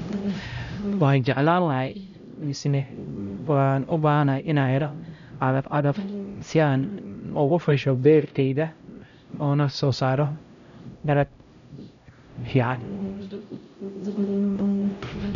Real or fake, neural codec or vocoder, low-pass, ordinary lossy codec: fake; codec, 16 kHz, 0.5 kbps, X-Codec, HuBERT features, trained on LibriSpeech; 7.2 kHz; none